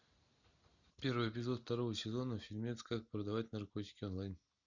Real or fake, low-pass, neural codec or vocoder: real; 7.2 kHz; none